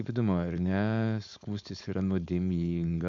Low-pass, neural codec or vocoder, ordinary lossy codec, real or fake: 7.2 kHz; codec, 16 kHz, 4.8 kbps, FACodec; MP3, 64 kbps; fake